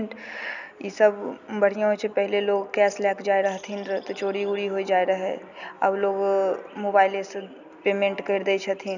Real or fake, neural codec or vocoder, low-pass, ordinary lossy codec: real; none; 7.2 kHz; none